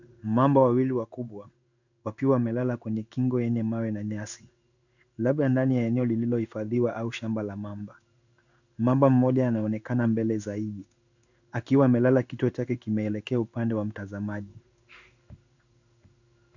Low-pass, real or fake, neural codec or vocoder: 7.2 kHz; fake; codec, 16 kHz in and 24 kHz out, 1 kbps, XY-Tokenizer